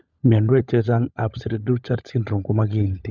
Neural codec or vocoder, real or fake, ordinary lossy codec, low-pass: codec, 16 kHz, 16 kbps, FunCodec, trained on LibriTTS, 50 frames a second; fake; none; 7.2 kHz